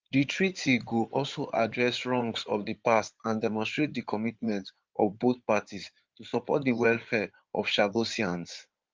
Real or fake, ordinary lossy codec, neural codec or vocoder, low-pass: fake; Opus, 24 kbps; codec, 16 kHz in and 24 kHz out, 2.2 kbps, FireRedTTS-2 codec; 7.2 kHz